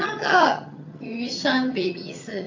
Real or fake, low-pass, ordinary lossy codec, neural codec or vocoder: fake; 7.2 kHz; AAC, 48 kbps; vocoder, 22.05 kHz, 80 mel bands, HiFi-GAN